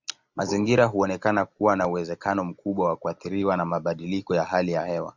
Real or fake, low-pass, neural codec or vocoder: real; 7.2 kHz; none